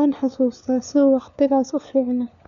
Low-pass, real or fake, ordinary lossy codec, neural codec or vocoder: 7.2 kHz; fake; none; codec, 16 kHz, 4 kbps, FunCodec, trained on LibriTTS, 50 frames a second